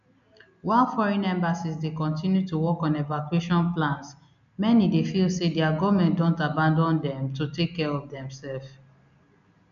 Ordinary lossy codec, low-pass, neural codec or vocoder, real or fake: none; 7.2 kHz; none; real